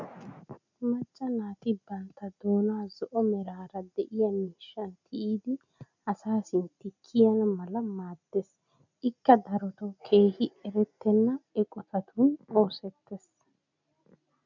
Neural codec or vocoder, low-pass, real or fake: none; 7.2 kHz; real